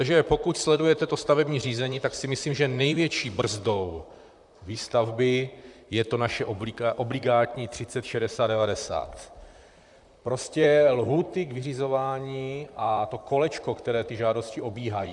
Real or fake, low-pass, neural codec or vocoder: fake; 10.8 kHz; vocoder, 44.1 kHz, 128 mel bands, Pupu-Vocoder